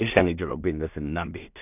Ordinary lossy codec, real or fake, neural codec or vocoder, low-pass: none; fake; codec, 16 kHz in and 24 kHz out, 0.4 kbps, LongCat-Audio-Codec, two codebook decoder; 3.6 kHz